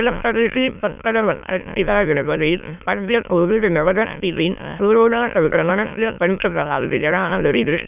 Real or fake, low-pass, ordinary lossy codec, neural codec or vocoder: fake; 3.6 kHz; none; autoencoder, 22.05 kHz, a latent of 192 numbers a frame, VITS, trained on many speakers